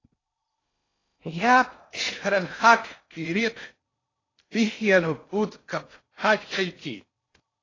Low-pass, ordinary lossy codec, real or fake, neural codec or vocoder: 7.2 kHz; AAC, 32 kbps; fake; codec, 16 kHz in and 24 kHz out, 0.6 kbps, FocalCodec, streaming, 4096 codes